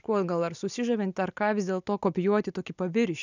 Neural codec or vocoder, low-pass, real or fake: none; 7.2 kHz; real